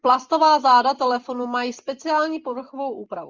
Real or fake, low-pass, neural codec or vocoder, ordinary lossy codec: real; 7.2 kHz; none; Opus, 32 kbps